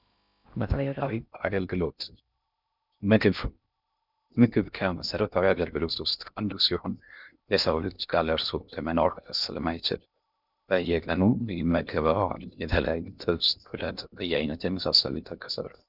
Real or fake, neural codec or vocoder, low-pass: fake; codec, 16 kHz in and 24 kHz out, 0.6 kbps, FocalCodec, streaming, 2048 codes; 5.4 kHz